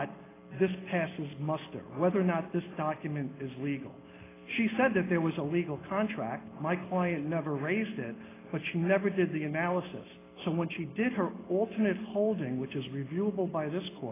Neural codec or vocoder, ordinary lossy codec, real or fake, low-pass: none; AAC, 16 kbps; real; 3.6 kHz